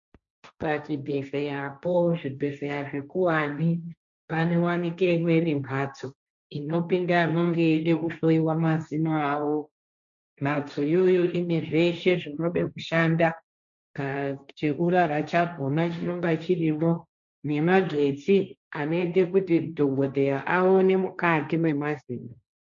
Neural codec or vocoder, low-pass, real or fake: codec, 16 kHz, 1.1 kbps, Voila-Tokenizer; 7.2 kHz; fake